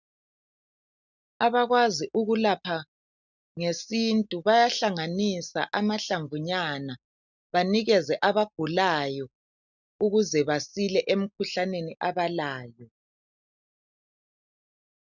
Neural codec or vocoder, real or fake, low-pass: none; real; 7.2 kHz